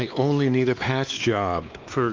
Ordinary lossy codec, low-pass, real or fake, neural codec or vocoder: Opus, 24 kbps; 7.2 kHz; fake; codec, 16 kHz, 2 kbps, X-Codec, WavLM features, trained on Multilingual LibriSpeech